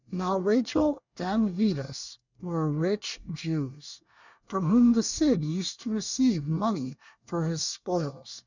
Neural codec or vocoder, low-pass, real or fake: codec, 24 kHz, 1 kbps, SNAC; 7.2 kHz; fake